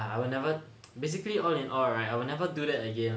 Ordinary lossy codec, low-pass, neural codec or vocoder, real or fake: none; none; none; real